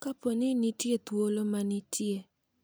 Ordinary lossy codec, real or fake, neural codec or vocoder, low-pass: none; real; none; none